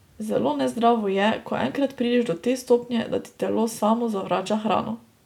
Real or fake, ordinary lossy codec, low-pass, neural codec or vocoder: real; none; 19.8 kHz; none